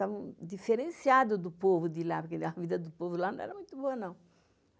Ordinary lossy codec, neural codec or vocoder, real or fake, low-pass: none; none; real; none